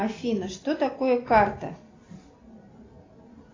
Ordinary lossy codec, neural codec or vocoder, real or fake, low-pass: AAC, 32 kbps; none; real; 7.2 kHz